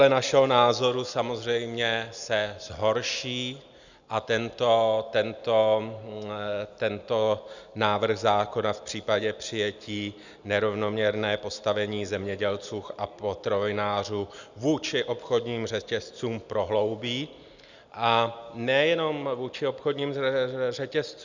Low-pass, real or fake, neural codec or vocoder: 7.2 kHz; real; none